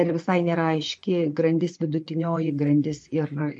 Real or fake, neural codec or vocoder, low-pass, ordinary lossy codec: fake; vocoder, 24 kHz, 100 mel bands, Vocos; 10.8 kHz; MP3, 48 kbps